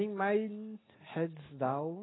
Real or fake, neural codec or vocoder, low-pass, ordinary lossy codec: real; none; 7.2 kHz; AAC, 16 kbps